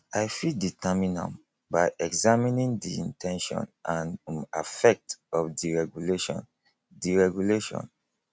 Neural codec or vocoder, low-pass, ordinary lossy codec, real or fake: none; none; none; real